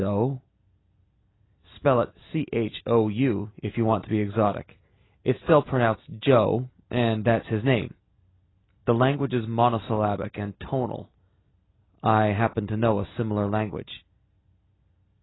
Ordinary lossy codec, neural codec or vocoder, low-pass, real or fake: AAC, 16 kbps; none; 7.2 kHz; real